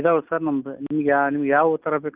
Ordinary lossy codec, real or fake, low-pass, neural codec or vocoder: Opus, 24 kbps; real; 3.6 kHz; none